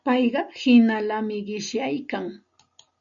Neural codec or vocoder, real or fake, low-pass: none; real; 7.2 kHz